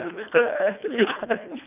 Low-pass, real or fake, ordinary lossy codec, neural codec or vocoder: 3.6 kHz; fake; none; codec, 24 kHz, 1.5 kbps, HILCodec